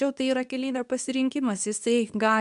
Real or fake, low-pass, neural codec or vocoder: fake; 10.8 kHz; codec, 24 kHz, 0.9 kbps, WavTokenizer, medium speech release version 2